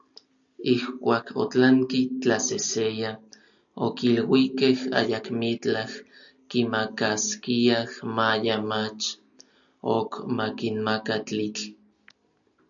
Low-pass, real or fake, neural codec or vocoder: 7.2 kHz; real; none